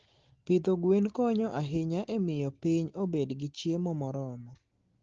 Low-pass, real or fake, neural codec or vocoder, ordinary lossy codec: 7.2 kHz; real; none; Opus, 16 kbps